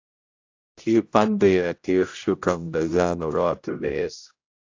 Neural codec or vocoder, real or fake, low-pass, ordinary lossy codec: codec, 16 kHz, 0.5 kbps, X-Codec, HuBERT features, trained on general audio; fake; 7.2 kHz; AAC, 48 kbps